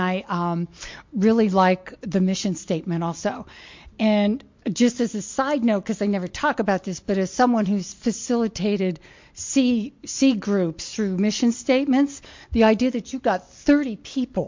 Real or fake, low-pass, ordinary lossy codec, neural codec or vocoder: real; 7.2 kHz; MP3, 48 kbps; none